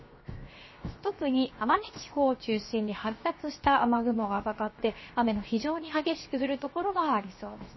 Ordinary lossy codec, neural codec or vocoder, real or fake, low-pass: MP3, 24 kbps; codec, 16 kHz, 0.7 kbps, FocalCodec; fake; 7.2 kHz